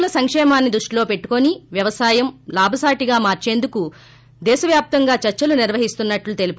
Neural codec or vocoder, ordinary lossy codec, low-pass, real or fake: none; none; none; real